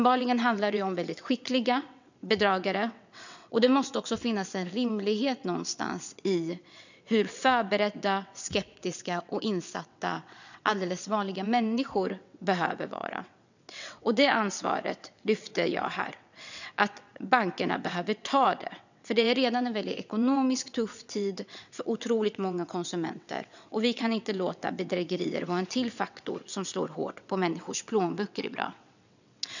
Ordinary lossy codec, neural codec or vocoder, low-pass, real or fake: none; vocoder, 22.05 kHz, 80 mel bands, WaveNeXt; 7.2 kHz; fake